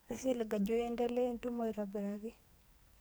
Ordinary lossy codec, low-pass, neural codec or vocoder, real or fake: none; none; codec, 44.1 kHz, 2.6 kbps, SNAC; fake